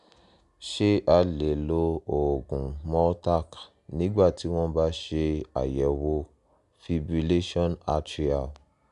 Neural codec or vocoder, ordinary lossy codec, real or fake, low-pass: none; none; real; 10.8 kHz